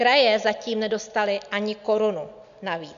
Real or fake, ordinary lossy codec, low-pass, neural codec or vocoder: real; AAC, 96 kbps; 7.2 kHz; none